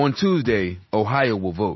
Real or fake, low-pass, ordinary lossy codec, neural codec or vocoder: real; 7.2 kHz; MP3, 24 kbps; none